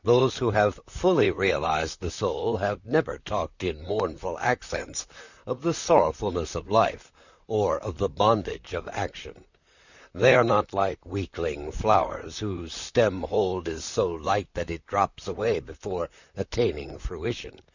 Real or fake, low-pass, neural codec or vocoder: fake; 7.2 kHz; vocoder, 44.1 kHz, 128 mel bands, Pupu-Vocoder